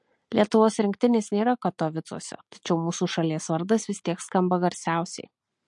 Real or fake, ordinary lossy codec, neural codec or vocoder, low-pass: real; MP3, 48 kbps; none; 10.8 kHz